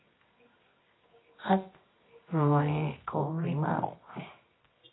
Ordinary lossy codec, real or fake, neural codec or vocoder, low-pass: AAC, 16 kbps; fake; codec, 24 kHz, 0.9 kbps, WavTokenizer, medium music audio release; 7.2 kHz